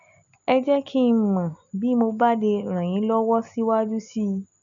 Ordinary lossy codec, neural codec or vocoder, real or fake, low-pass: none; none; real; 7.2 kHz